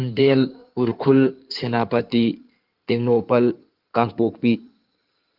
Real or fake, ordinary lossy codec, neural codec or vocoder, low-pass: fake; Opus, 32 kbps; codec, 16 kHz in and 24 kHz out, 2.2 kbps, FireRedTTS-2 codec; 5.4 kHz